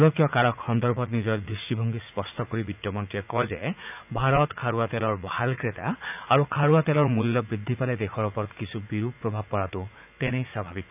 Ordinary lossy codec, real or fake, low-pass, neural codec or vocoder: none; fake; 3.6 kHz; vocoder, 44.1 kHz, 80 mel bands, Vocos